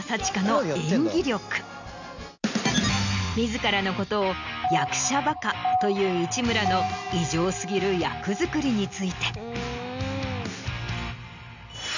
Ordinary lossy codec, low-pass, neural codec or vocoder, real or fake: none; 7.2 kHz; none; real